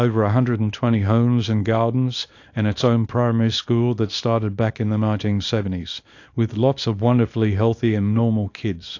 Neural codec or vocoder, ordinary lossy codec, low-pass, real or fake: codec, 24 kHz, 0.9 kbps, WavTokenizer, small release; AAC, 48 kbps; 7.2 kHz; fake